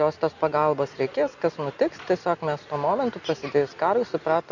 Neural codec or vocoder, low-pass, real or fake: none; 7.2 kHz; real